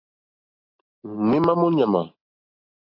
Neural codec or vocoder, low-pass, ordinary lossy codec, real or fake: none; 5.4 kHz; AAC, 32 kbps; real